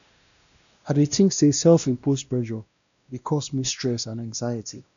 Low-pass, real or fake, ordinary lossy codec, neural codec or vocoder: 7.2 kHz; fake; none; codec, 16 kHz, 1 kbps, X-Codec, WavLM features, trained on Multilingual LibriSpeech